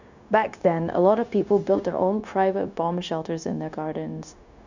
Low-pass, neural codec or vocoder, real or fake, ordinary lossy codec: 7.2 kHz; codec, 16 kHz, 0.9 kbps, LongCat-Audio-Codec; fake; none